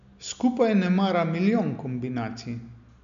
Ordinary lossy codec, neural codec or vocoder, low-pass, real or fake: none; none; 7.2 kHz; real